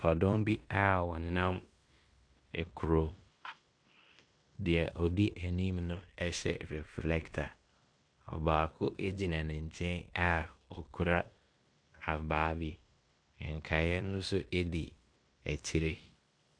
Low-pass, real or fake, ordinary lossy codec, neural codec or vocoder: 9.9 kHz; fake; MP3, 64 kbps; codec, 16 kHz in and 24 kHz out, 0.9 kbps, LongCat-Audio-Codec, fine tuned four codebook decoder